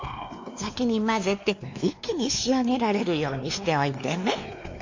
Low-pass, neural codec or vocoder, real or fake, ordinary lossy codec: 7.2 kHz; codec, 16 kHz, 2 kbps, X-Codec, WavLM features, trained on Multilingual LibriSpeech; fake; AAC, 48 kbps